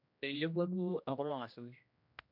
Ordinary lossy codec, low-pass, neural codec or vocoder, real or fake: MP3, 48 kbps; 5.4 kHz; codec, 16 kHz, 1 kbps, X-Codec, HuBERT features, trained on general audio; fake